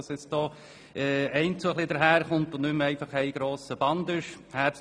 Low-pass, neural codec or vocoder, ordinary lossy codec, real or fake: none; none; none; real